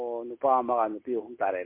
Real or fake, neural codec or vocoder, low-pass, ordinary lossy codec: real; none; 3.6 kHz; MP3, 24 kbps